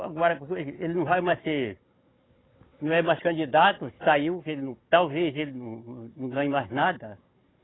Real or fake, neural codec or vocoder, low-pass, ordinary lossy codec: fake; codec, 16 kHz, 16 kbps, FunCodec, trained on Chinese and English, 50 frames a second; 7.2 kHz; AAC, 16 kbps